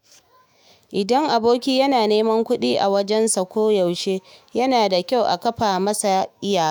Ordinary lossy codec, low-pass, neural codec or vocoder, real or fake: none; none; autoencoder, 48 kHz, 128 numbers a frame, DAC-VAE, trained on Japanese speech; fake